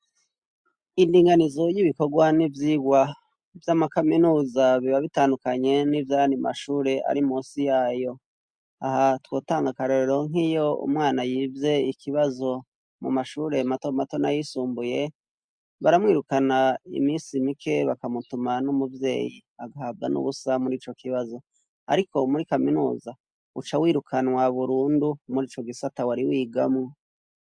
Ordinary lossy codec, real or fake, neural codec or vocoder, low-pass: MP3, 64 kbps; real; none; 9.9 kHz